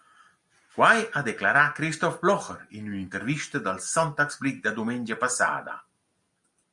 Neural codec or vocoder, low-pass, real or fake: none; 10.8 kHz; real